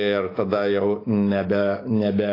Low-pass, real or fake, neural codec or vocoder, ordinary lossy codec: 5.4 kHz; real; none; AAC, 24 kbps